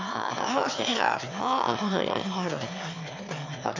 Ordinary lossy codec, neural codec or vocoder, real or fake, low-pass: MP3, 64 kbps; autoencoder, 22.05 kHz, a latent of 192 numbers a frame, VITS, trained on one speaker; fake; 7.2 kHz